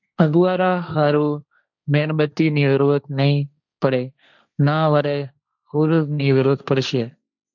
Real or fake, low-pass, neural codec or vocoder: fake; 7.2 kHz; codec, 16 kHz, 1.1 kbps, Voila-Tokenizer